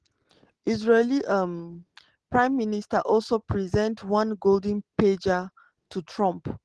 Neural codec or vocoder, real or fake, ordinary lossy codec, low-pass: none; real; Opus, 16 kbps; 10.8 kHz